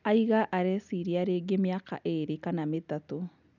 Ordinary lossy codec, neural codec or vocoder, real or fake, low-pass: none; none; real; 7.2 kHz